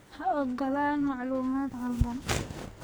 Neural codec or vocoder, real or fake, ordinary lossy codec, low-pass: codec, 44.1 kHz, 2.6 kbps, SNAC; fake; none; none